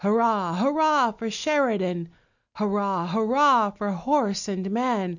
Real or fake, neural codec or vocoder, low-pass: real; none; 7.2 kHz